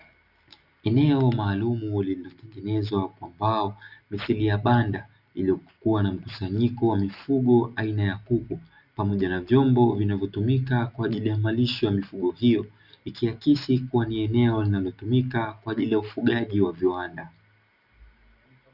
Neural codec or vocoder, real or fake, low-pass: none; real; 5.4 kHz